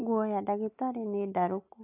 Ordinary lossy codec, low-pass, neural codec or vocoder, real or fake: AAC, 24 kbps; 3.6 kHz; none; real